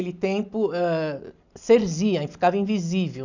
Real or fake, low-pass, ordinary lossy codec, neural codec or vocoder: real; 7.2 kHz; none; none